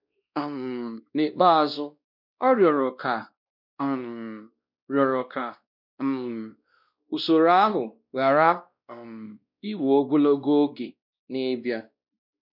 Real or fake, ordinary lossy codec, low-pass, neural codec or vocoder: fake; none; 5.4 kHz; codec, 16 kHz, 1 kbps, X-Codec, WavLM features, trained on Multilingual LibriSpeech